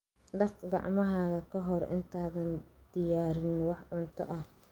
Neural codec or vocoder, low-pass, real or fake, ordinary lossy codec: autoencoder, 48 kHz, 128 numbers a frame, DAC-VAE, trained on Japanese speech; 19.8 kHz; fake; Opus, 32 kbps